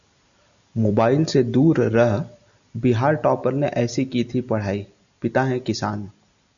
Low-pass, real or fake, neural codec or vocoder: 7.2 kHz; real; none